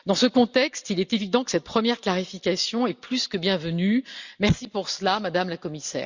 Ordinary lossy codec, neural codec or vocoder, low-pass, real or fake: Opus, 64 kbps; none; 7.2 kHz; real